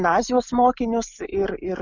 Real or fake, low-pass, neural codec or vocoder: real; 7.2 kHz; none